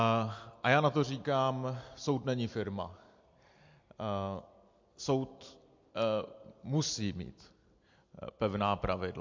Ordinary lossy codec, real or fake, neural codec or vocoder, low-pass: MP3, 48 kbps; real; none; 7.2 kHz